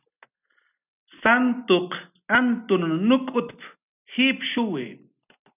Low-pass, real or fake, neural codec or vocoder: 3.6 kHz; real; none